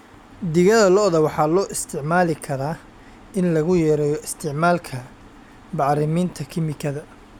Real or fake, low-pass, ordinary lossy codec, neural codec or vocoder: real; 19.8 kHz; none; none